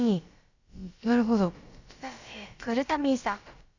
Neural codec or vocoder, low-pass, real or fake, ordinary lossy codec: codec, 16 kHz, about 1 kbps, DyCAST, with the encoder's durations; 7.2 kHz; fake; Opus, 64 kbps